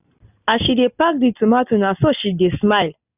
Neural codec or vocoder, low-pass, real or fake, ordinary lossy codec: none; 3.6 kHz; real; none